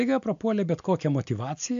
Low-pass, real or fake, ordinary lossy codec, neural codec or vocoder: 7.2 kHz; real; MP3, 64 kbps; none